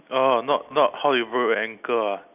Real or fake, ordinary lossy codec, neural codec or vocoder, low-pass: real; none; none; 3.6 kHz